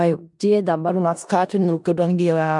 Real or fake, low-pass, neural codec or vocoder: fake; 10.8 kHz; codec, 16 kHz in and 24 kHz out, 0.4 kbps, LongCat-Audio-Codec, four codebook decoder